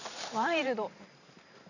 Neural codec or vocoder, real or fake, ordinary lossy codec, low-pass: vocoder, 44.1 kHz, 128 mel bands every 512 samples, BigVGAN v2; fake; none; 7.2 kHz